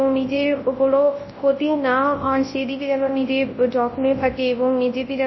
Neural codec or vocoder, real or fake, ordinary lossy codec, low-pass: codec, 24 kHz, 0.9 kbps, WavTokenizer, large speech release; fake; MP3, 24 kbps; 7.2 kHz